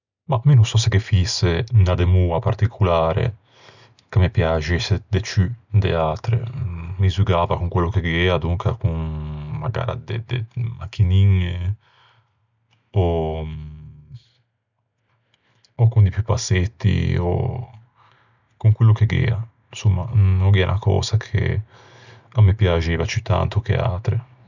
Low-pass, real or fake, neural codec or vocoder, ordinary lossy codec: 7.2 kHz; real; none; none